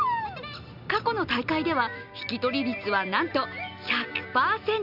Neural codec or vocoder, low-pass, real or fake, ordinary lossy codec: none; 5.4 kHz; real; none